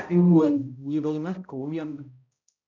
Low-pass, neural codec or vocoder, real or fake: 7.2 kHz; codec, 16 kHz, 0.5 kbps, X-Codec, HuBERT features, trained on balanced general audio; fake